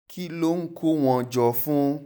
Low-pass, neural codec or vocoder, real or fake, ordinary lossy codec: none; none; real; none